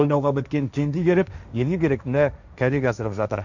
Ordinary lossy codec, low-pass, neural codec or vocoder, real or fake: none; 7.2 kHz; codec, 16 kHz, 1.1 kbps, Voila-Tokenizer; fake